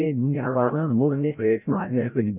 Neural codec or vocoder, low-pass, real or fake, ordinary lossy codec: codec, 16 kHz, 0.5 kbps, FreqCodec, larger model; 3.6 kHz; fake; none